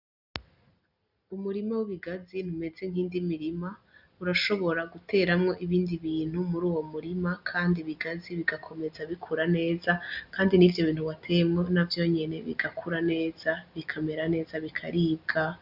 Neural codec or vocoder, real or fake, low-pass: none; real; 5.4 kHz